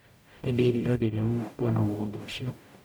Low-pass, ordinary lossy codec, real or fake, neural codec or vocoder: none; none; fake; codec, 44.1 kHz, 0.9 kbps, DAC